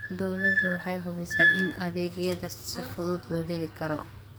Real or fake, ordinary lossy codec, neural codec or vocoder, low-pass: fake; none; codec, 44.1 kHz, 2.6 kbps, SNAC; none